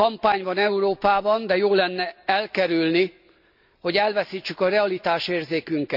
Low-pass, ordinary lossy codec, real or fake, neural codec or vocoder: 5.4 kHz; none; real; none